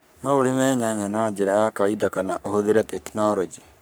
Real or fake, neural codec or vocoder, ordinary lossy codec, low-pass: fake; codec, 44.1 kHz, 3.4 kbps, Pupu-Codec; none; none